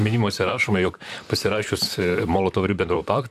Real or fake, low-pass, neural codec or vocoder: fake; 14.4 kHz; vocoder, 44.1 kHz, 128 mel bands, Pupu-Vocoder